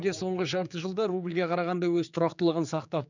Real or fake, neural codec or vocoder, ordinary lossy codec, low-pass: fake; codec, 16 kHz, 4 kbps, X-Codec, HuBERT features, trained on general audio; none; 7.2 kHz